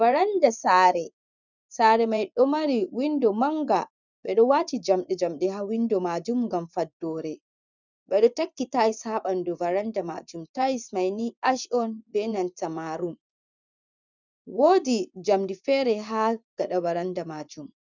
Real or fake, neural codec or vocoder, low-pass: fake; vocoder, 24 kHz, 100 mel bands, Vocos; 7.2 kHz